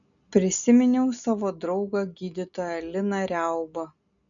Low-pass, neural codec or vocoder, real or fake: 7.2 kHz; none; real